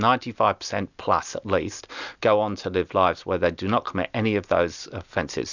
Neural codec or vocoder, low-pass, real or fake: none; 7.2 kHz; real